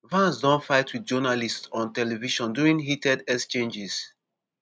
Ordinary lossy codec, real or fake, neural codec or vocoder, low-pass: none; real; none; none